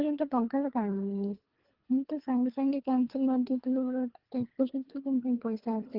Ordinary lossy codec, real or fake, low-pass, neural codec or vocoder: Opus, 16 kbps; fake; 5.4 kHz; codec, 24 kHz, 3 kbps, HILCodec